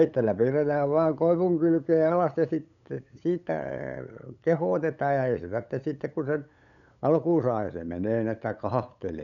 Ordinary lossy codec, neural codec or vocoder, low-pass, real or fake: none; codec, 16 kHz, 8 kbps, FunCodec, trained on LibriTTS, 25 frames a second; 7.2 kHz; fake